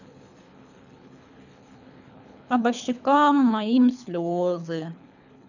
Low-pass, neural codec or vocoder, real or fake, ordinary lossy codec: 7.2 kHz; codec, 24 kHz, 3 kbps, HILCodec; fake; none